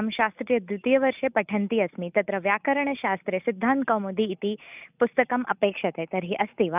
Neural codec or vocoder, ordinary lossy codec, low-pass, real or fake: none; none; 3.6 kHz; real